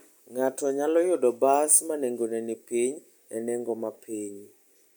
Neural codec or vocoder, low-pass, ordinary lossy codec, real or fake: none; none; none; real